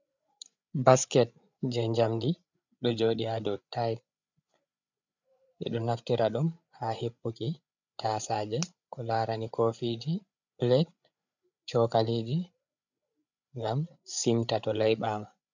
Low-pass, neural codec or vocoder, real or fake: 7.2 kHz; codec, 16 kHz, 8 kbps, FreqCodec, larger model; fake